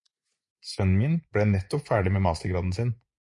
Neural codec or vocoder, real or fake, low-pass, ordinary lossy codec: vocoder, 24 kHz, 100 mel bands, Vocos; fake; 10.8 kHz; MP3, 48 kbps